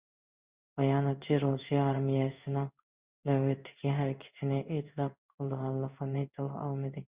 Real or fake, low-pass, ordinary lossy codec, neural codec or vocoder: fake; 3.6 kHz; Opus, 64 kbps; codec, 16 kHz in and 24 kHz out, 1 kbps, XY-Tokenizer